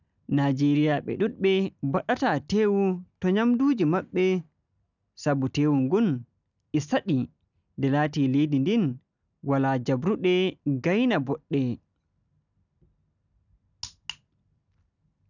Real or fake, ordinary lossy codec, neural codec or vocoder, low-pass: real; none; none; 7.2 kHz